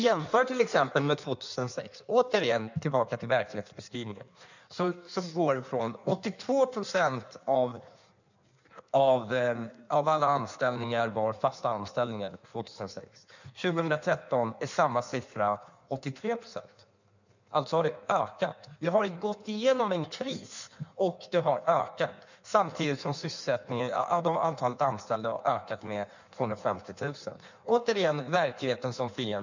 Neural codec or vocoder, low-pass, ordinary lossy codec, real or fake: codec, 16 kHz in and 24 kHz out, 1.1 kbps, FireRedTTS-2 codec; 7.2 kHz; none; fake